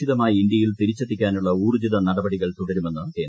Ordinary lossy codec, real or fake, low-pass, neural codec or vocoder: none; real; none; none